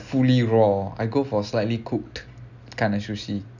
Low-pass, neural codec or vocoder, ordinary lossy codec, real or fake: 7.2 kHz; none; none; real